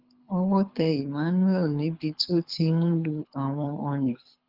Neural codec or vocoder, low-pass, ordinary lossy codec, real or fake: codec, 24 kHz, 6 kbps, HILCodec; 5.4 kHz; Opus, 32 kbps; fake